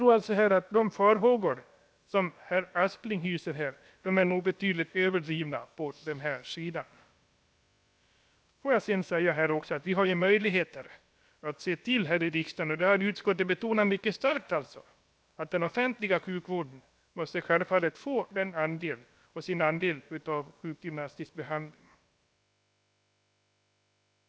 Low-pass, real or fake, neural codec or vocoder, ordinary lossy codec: none; fake; codec, 16 kHz, about 1 kbps, DyCAST, with the encoder's durations; none